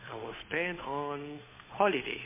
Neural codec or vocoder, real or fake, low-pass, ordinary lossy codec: codec, 16 kHz, 8 kbps, FunCodec, trained on Chinese and English, 25 frames a second; fake; 3.6 kHz; MP3, 16 kbps